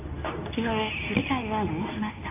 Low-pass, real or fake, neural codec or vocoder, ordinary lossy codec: 3.6 kHz; fake; codec, 24 kHz, 0.9 kbps, WavTokenizer, medium speech release version 2; AAC, 32 kbps